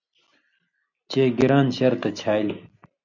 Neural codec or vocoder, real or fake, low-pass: none; real; 7.2 kHz